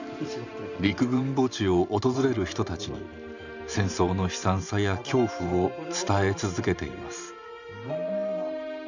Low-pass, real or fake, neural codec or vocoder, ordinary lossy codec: 7.2 kHz; fake; vocoder, 44.1 kHz, 128 mel bands every 512 samples, BigVGAN v2; none